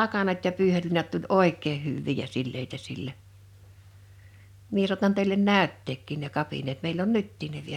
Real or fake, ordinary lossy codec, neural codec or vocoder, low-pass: real; none; none; 19.8 kHz